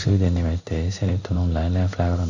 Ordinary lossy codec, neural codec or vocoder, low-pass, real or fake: none; codec, 16 kHz in and 24 kHz out, 1 kbps, XY-Tokenizer; 7.2 kHz; fake